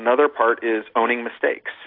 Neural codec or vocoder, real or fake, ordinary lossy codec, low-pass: none; real; AAC, 32 kbps; 5.4 kHz